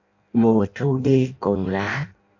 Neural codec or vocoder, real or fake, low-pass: codec, 16 kHz in and 24 kHz out, 0.6 kbps, FireRedTTS-2 codec; fake; 7.2 kHz